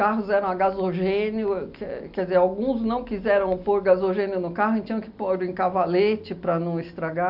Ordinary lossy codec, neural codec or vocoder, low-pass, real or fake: none; none; 5.4 kHz; real